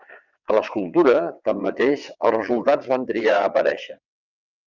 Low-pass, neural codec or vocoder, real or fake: 7.2 kHz; vocoder, 22.05 kHz, 80 mel bands, WaveNeXt; fake